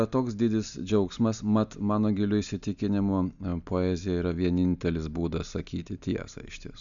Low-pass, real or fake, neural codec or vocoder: 7.2 kHz; real; none